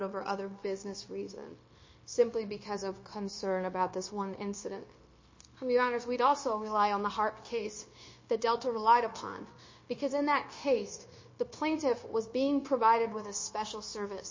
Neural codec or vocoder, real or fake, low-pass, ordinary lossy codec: codec, 16 kHz, 0.9 kbps, LongCat-Audio-Codec; fake; 7.2 kHz; MP3, 32 kbps